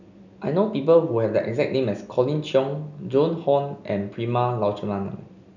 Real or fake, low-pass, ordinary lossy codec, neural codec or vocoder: real; 7.2 kHz; none; none